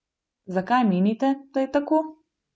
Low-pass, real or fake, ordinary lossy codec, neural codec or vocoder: none; real; none; none